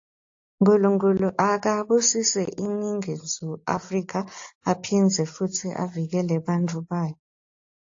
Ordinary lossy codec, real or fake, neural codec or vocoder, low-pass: AAC, 48 kbps; real; none; 7.2 kHz